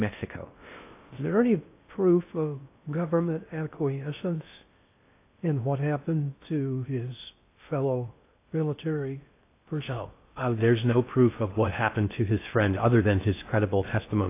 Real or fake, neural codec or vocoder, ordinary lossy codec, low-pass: fake; codec, 16 kHz in and 24 kHz out, 0.6 kbps, FocalCodec, streaming, 4096 codes; AAC, 24 kbps; 3.6 kHz